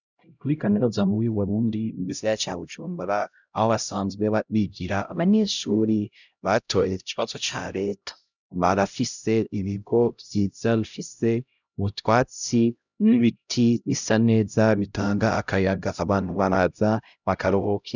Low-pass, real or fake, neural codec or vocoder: 7.2 kHz; fake; codec, 16 kHz, 0.5 kbps, X-Codec, HuBERT features, trained on LibriSpeech